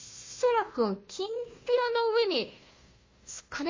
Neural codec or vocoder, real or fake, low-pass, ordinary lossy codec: codec, 16 kHz, 1 kbps, FunCodec, trained on Chinese and English, 50 frames a second; fake; 7.2 kHz; MP3, 32 kbps